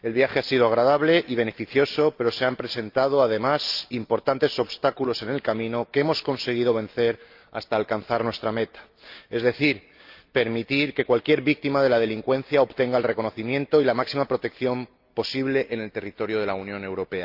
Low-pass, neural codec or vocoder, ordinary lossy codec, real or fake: 5.4 kHz; none; Opus, 24 kbps; real